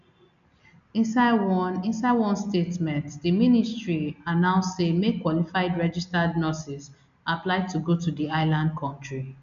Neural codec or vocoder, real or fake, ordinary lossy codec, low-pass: none; real; none; 7.2 kHz